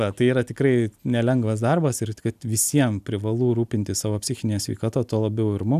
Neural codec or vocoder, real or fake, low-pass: none; real; 14.4 kHz